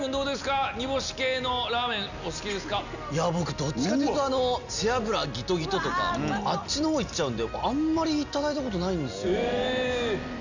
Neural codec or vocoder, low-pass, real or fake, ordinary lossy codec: none; 7.2 kHz; real; none